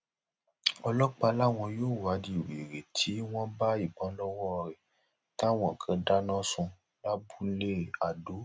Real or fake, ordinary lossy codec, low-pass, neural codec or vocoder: real; none; none; none